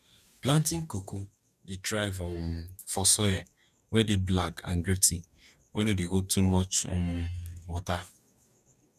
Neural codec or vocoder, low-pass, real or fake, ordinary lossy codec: codec, 44.1 kHz, 2.6 kbps, DAC; 14.4 kHz; fake; none